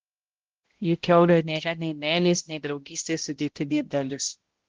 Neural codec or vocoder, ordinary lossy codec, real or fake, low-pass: codec, 16 kHz, 0.5 kbps, X-Codec, HuBERT features, trained on balanced general audio; Opus, 16 kbps; fake; 7.2 kHz